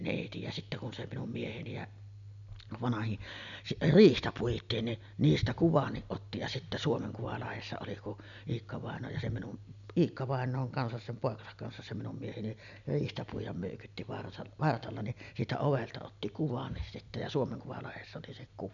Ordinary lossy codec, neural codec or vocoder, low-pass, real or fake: none; none; 7.2 kHz; real